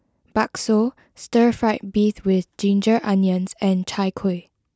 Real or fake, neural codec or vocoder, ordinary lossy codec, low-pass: real; none; none; none